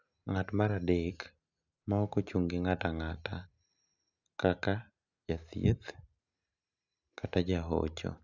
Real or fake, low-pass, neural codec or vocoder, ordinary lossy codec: real; 7.2 kHz; none; none